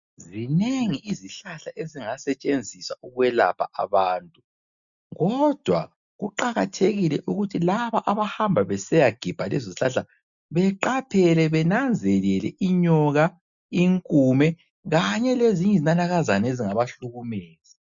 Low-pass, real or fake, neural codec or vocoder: 7.2 kHz; real; none